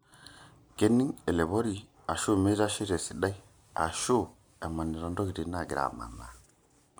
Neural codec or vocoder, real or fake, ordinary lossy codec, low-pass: none; real; none; none